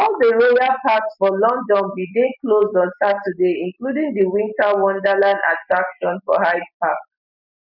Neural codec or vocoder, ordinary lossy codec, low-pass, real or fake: none; none; 5.4 kHz; real